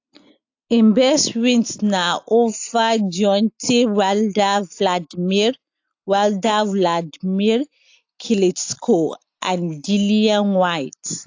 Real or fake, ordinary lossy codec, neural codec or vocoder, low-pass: real; AAC, 48 kbps; none; 7.2 kHz